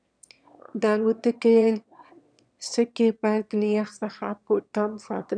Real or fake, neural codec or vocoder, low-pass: fake; autoencoder, 22.05 kHz, a latent of 192 numbers a frame, VITS, trained on one speaker; 9.9 kHz